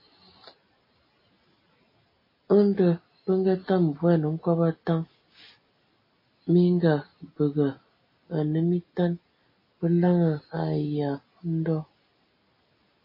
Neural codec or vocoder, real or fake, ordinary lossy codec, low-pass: none; real; MP3, 24 kbps; 5.4 kHz